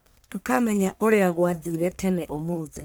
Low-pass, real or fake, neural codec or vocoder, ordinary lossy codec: none; fake; codec, 44.1 kHz, 1.7 kbps, Pupu-Codec; none